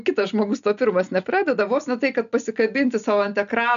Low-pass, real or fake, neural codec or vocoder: 7.2 kHz; real; none